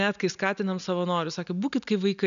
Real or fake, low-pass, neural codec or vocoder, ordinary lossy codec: real; 7.2 kHz; none; MP3, 96 kbps